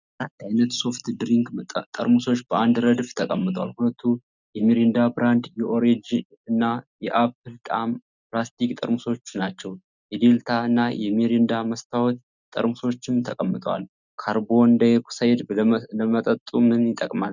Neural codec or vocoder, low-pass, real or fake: none; 7.2 kHz; real